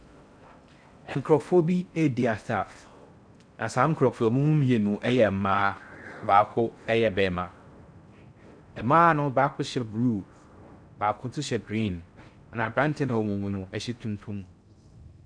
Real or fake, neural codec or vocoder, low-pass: fake; codec, 16 kHz in and 24 kHz out, 0.6 kbps, FocalCodec, streaming, 4096 codes; 9.9 kHz